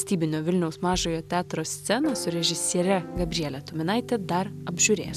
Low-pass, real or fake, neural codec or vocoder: 14.4 kHz; real; none